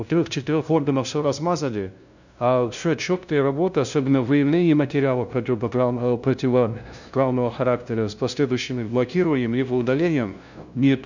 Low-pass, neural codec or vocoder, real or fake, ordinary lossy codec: 7.2 kHz; codec, 16 kHz, 0.5 kbps, FunCodec, trained on LibriTTS, 25 frames a second; fake; none